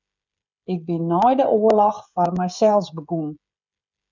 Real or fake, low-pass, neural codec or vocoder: fake; 7.2 kHz; codec, 16 kHz, 16 kbps, FreqCodec, smaller model